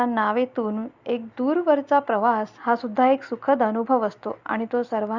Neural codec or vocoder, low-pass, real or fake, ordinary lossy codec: none; 7.2 kHz; real; Opus, 64 kbps